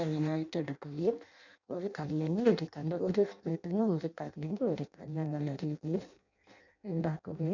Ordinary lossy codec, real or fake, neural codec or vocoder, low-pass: none; fake; codec, 16 kHz in and 24 kHz out, 0.6 kbps, FireRedTTS-2 codec; 7.2 kHz